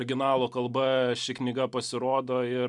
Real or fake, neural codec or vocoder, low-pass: real; none; 10.8 kHz